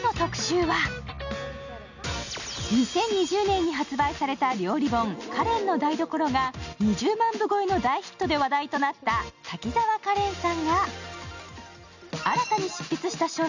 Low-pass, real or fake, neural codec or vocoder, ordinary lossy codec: 7.2 kHz; real; none; none